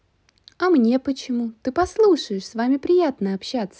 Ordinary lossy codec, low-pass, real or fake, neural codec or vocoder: none; none; real; none